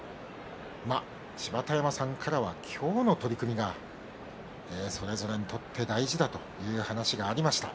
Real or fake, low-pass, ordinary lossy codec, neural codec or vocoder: real; none; none; none